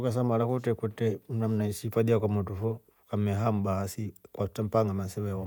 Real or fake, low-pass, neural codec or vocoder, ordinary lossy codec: fake; none; vocoder, 48 kHz, 128 mel bands, Vocos; none